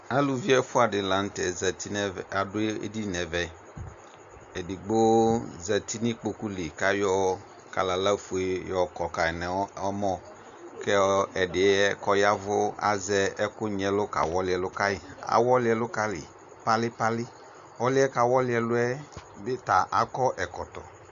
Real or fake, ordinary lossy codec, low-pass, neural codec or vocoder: real; MP3, 64 kbps; 7.2 kHz; none